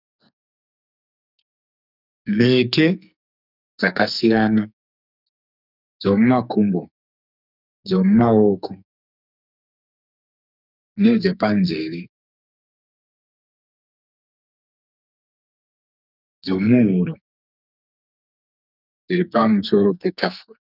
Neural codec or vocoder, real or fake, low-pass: codec, 44.1 kHz, 2.6 kbps, SNAC; fake; 5.4 kHz